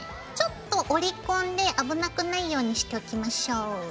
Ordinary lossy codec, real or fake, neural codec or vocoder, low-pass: none; real; none; none